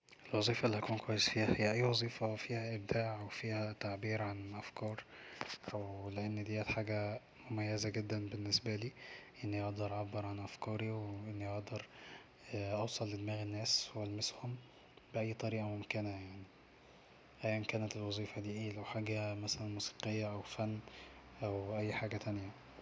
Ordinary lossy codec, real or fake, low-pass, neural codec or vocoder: none; real; none; none